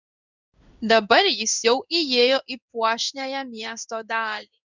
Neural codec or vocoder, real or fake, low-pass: codec, 16 kHz in and 24 kHz out, 1 kbps, XY-Tokenizer; fake; 7.2 kHz